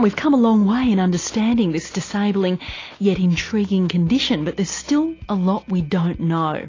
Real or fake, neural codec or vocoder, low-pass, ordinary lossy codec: real; none; 7.2 kHz; AAC, 32 kbps